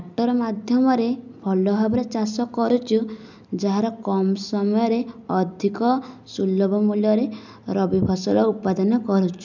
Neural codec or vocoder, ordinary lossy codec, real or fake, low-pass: none; none; real; 7.2 kHz